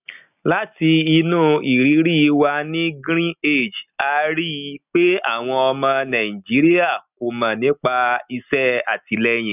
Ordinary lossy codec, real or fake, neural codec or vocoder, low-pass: none; real; none; 3.6 kHz